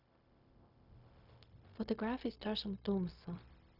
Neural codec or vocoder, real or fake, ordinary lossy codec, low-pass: codec, 16 kHz, 0.4 kbps, LongCat-Audio-Codec; fake; none; 5.4 kHz